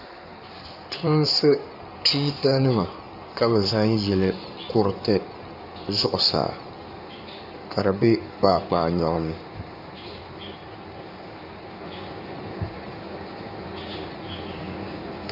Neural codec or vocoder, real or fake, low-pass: codec, 16 kHz in and 24 kHz out, 2.2 kbps, FireRedTTS-2 codec; fake; 5.4 kHz